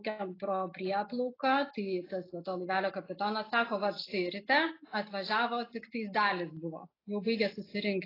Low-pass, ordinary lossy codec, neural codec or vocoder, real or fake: 5.4 kHz; AAC, 24 kbps; none; real